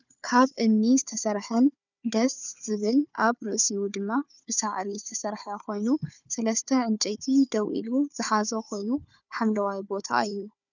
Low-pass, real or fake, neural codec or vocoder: 7.2 kHz; fake; codec, 16 kHz, 4 kbps, FunCodec, trained on Chinese and English, 50 frames a second